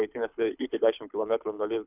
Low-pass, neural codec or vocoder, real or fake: 3.6 kHz; codec, 24 kHz, 6 kbps, HILCodec; fake